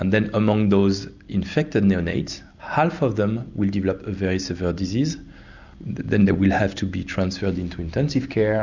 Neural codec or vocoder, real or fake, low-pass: none; real; 7.2 kHz